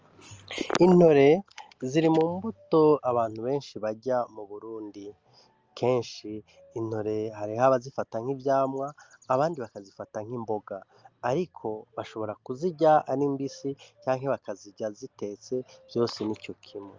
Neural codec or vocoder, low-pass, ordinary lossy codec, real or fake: none; 7.2 kHz; Opus, 24 kbps; real